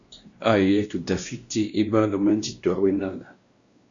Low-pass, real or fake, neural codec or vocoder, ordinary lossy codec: 7.2 kHz; fake; codec, 16 kHz, 1 kbps, X-Codec, WavLM features, trained on Multilingual LibriSpeech; Opus, 64 kbps